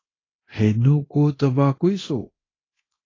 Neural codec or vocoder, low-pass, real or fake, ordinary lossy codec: codec, 24 kHz, 0.9 kbps, DualCodec; 7.2 kHz; fake; AAC, 32 kbps